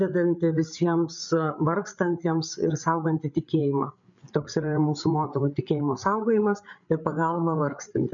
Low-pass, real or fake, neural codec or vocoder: 7.2 kHz; fake; codec, 16 kHz, 8 kbps, FreqCodec, larger model